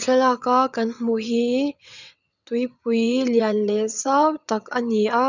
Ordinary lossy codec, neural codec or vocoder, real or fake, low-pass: none; vocoder, 22.05 kHz, 80 mel bands, WaveNeXt; fake; 7.2 kHz